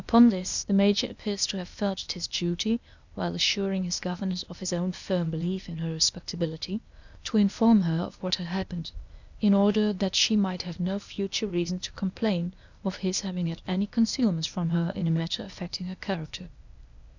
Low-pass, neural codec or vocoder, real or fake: 7.2 kHz; codec, 16 kHz, 0.8 kbps, ZipCodec; fake